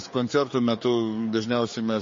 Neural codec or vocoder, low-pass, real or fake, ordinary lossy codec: codec, 16 kHz, 4 kbps, X-Codec, HuBERT features, trained on general audio; 7.2 kHz; fake; MP3, 32 kbps